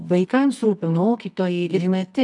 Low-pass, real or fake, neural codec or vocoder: 10.8 kHz; fake; codec, 24 kHz, 0.9 kbps, WavTokenizer, medium music audio release